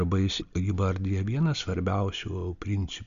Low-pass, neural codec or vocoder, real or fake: 7.2 kHz; none; real